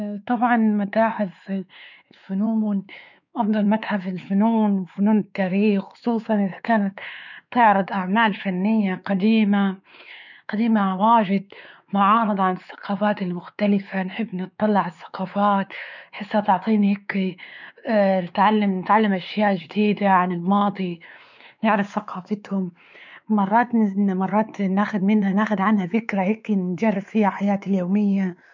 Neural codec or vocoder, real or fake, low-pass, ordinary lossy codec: codec, 16 kHz, 4 kbps, X-Codec, WavLM features, trained on Multilingual LibriSpeech; fake; 7.2 kHz; none